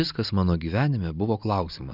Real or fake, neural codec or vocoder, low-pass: real; none; 5.4 kHz